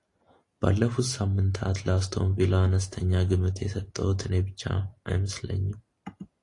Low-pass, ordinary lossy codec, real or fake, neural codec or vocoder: 10.8 kHz; AAC, 48 kbps; real; none